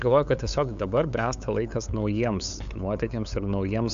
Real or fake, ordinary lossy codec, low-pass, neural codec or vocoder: fake; AAC, 64 kbps; 7.2 kHz; codec, 16 kHz, 8 kbps, FunCodec, trained on LibriTTS, 25 frames a second